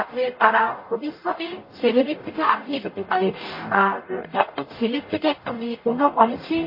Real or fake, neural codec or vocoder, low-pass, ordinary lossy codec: fake; codec, 44.1 kHz, 0.9 kbps, DAC; 5.4 kHz; MP3, 24 kbps